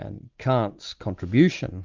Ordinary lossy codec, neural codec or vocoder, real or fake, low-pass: Opus, 32 kbps; none; real; 7.2 kHz